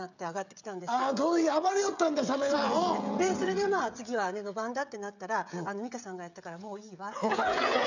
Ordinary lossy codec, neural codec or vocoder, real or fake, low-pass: none; codec, 16 kHz, 16 kbps, FreqCodec, smaller model; fake; 7.2 kHz